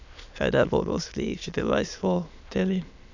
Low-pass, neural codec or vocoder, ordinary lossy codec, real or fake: 7.2 kHz; autoencoder, 22.05 kHz, a latent of 192 numbers a frame, VITS, trained on many speakers; none; fake